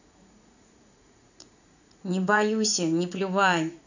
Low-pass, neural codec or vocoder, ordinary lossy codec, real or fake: 7.2 kHz; vocoder, 44.1 kHz, 80 mel bands, Vocos; none; fake